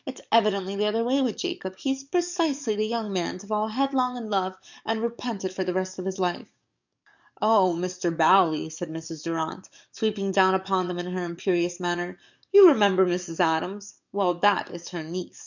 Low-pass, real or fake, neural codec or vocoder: 7.2 kHz; fake; codec, 44.1 kHz, 7.8 kbps, DAC